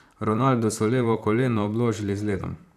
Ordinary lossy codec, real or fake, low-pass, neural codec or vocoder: none; fake; 14.4 kHz; vocoder, 44.1 kHz, 128 mel bands, Pupu-Vocoder